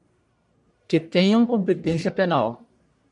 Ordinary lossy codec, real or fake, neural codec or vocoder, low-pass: MP3, 64 kbps; fake; codec, 44.1 kHz, 1.7 kbps, Pupu-Codec; 10.8 kHz